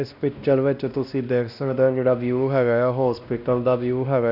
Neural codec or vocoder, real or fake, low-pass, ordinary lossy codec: codec, 16 kHz, 1 kbps, X-Codec, WavLM features, trained on Multilingual LibriSpeech; fake; 5.4 kHz; none